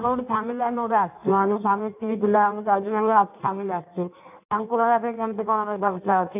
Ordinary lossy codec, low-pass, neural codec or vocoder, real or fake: none; 3.6 kHz; codec, 16 kHz in and 24 kHz out, 1.1 kbps, FireRedTTS-2 codec; fake